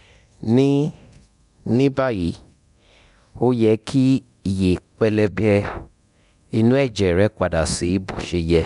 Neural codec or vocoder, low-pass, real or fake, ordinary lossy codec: codec, 24 kHz, 0.9 kbps, DualCodec; 10.8 kHz; fake; none